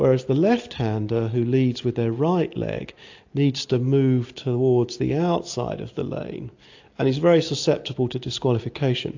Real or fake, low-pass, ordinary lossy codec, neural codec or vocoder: real; 7.2 kHz; AAC, 48 kbps; none